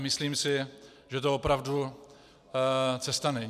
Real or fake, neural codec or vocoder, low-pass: real; none; 14.4 kHz